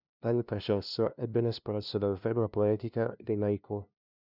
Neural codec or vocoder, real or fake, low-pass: codec, 16 kHz, 0.5 kbps, FunCodec, trained on LibriTTS, 25 frames a second; fake; 5.4 kHz